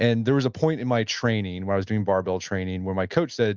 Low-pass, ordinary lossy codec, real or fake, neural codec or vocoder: 7.2 kHz; Opus, 32 kbps; real; none